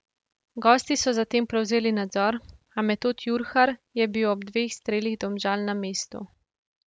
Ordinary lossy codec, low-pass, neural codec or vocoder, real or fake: none; none; none; real